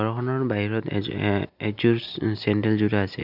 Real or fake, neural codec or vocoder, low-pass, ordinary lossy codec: real; none; 5.4 kHz; none